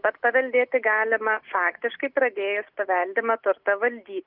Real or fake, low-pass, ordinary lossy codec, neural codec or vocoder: real; 5.4 kHz; Opus, 32 kbps; none